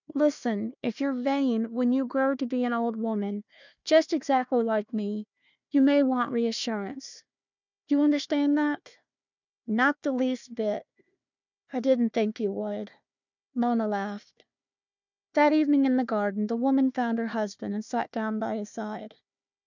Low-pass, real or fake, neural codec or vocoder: 7.2 kHz; fake; codec, 16 kHz, 1 kbps, FunCodec, trained on Chinese and English, 50 frames a second